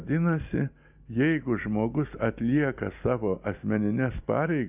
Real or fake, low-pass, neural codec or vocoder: real; 3.6 kHz; none